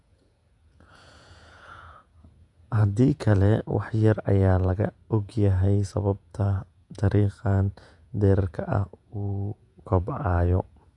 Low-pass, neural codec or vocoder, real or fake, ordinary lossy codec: 10.8 kHz; vocoder, 48 kHz, 128 mel bands, Vocos; fake; none